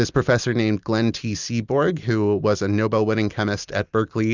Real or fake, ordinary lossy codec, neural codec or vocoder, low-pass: real; Opus, 64 kbps; none; 7.2 kHz